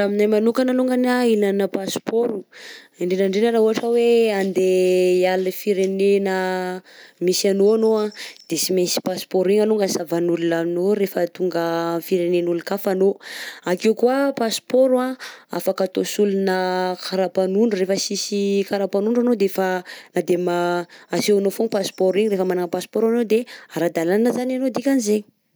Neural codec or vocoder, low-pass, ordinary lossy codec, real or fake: none; none; none; real